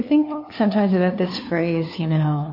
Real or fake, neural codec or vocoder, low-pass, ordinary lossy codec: fake; codec, 16 kHz, 2 kbps, FunCodec, trained on LibriTTS, 25 frames a second; 5.4 kHz; MP3, 32 kbps